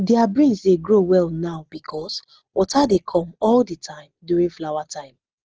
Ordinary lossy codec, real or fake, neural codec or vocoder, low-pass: Opus, 16 kbps; real; none; 7.2 kHz